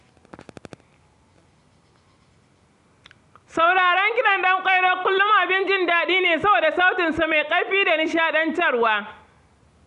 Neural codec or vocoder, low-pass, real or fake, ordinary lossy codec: none; 10.8 kHz; real; MP3, 96 kbps